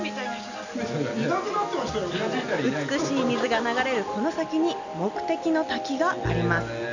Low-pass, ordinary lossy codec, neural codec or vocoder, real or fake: 7.2 kHz; none; none; real